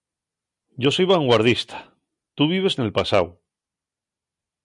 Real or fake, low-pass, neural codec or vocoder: real; 10.8 kHz; none